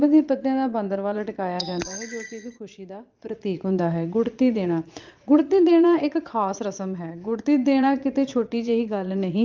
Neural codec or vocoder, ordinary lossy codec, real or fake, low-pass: vocoder, 22.05 kHz, 80 mel bands, Vocos; Opus, 32 kbps; fake; 7.2 kHz